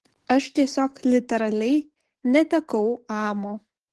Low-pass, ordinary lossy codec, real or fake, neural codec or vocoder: 10.8 kHz; Opus, 16 kbps; fake; codec, 44.1 kHz, 7.8 kbps, DAC